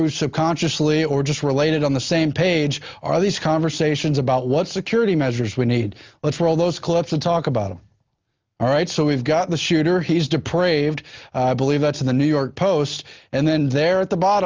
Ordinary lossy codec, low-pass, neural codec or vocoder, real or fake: Opus, 32 kbps; 7.2 kHz; none; real